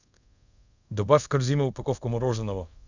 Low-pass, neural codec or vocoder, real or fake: 7.2 kHz; codec, 24 kHz, 0.5 kbps, DualCodec; fake